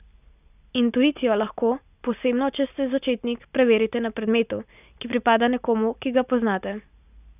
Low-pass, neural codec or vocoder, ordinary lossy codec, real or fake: 3.6 kHz; none; none; real